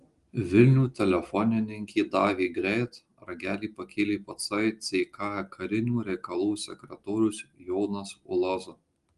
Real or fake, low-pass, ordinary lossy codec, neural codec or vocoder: real; 10.8 kHz; Opus, 24 kbps; none